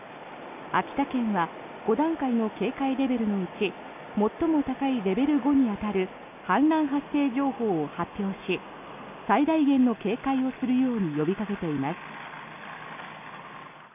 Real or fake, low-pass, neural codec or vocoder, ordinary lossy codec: real; 3.6 kHz; none; none